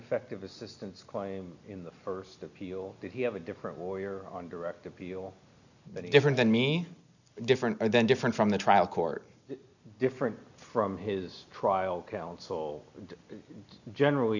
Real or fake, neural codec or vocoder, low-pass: real; none; 7.2 kHz